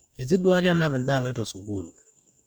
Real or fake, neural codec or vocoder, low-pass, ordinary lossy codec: fake; codec, 44.1 kHz, 2.6 kbps, DAC; 19.8 kHz; none